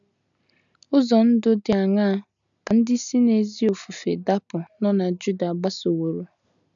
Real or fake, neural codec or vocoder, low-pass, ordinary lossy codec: real; none; 7.2 kHz; none